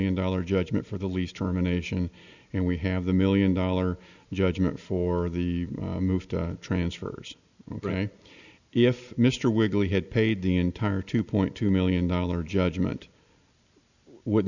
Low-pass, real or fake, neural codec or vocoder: 7.2 kHz; real; none